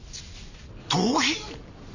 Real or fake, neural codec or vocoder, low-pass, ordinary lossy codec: real; none; 7.2 kHz; none